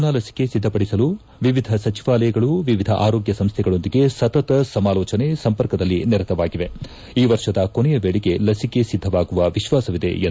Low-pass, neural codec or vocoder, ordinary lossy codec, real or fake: none; none; none; real